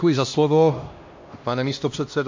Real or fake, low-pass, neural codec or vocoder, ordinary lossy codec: fake; 7.2 kHz; codec, 16 kHz, 1 kbps, X-Codec, HuBERT features, trained on LibriSpeech; MP3, 48 kbps